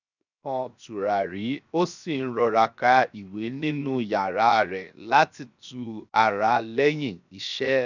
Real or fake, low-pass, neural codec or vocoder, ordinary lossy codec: fake; 7.2 kHz; codec, 16 kHz, 0.7 kbps, FocalCodec; none